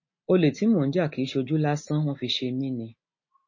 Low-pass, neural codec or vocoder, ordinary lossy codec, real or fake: 7.2 kHz; none; MP3, 32 kbps; real